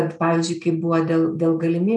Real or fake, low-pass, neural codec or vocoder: real; 10.8 kHz; none